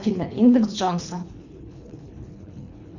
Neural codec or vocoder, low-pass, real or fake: codec, 24 kHz, 3 kbps, HILCodec; 7.2 kHz; fake